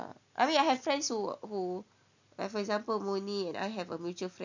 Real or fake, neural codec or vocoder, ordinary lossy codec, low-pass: real; none; none; 7.2 kHz